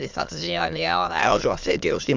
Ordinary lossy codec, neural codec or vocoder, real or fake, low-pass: MP3, 64 kbps; autoencoder, 22.05 kHz, a latent of 192 numbers a frame, VITS, trained on many speakers; fake; 7.2 kHz